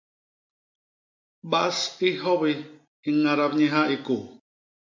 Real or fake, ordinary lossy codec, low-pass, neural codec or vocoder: real; MP3, 64 kbps; 7.2 kHz; none